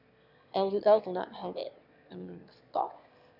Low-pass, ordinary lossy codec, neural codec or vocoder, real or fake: 5.4 kHz; none; autoencoder, 22.05 kHz, a latent of 192 numbers a frame, VITS, trained on one speaker; fake